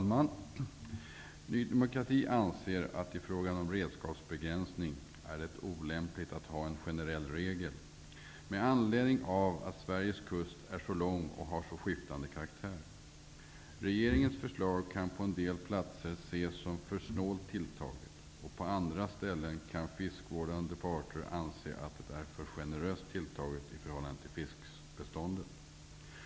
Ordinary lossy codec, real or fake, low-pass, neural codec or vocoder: none; real; none; none